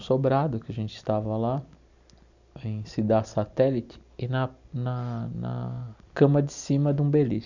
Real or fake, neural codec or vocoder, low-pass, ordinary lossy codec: real; none; 7.2 kHz; none